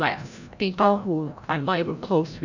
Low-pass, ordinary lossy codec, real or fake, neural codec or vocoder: 7.2 kHz; none; fake; codec, 16 kHz, 0.5 kbps, FreqCodec, larger model